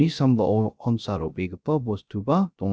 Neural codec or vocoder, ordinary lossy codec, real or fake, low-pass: codec, 16 kHz, 0.3 kbps, FocalCodec; none; fake; none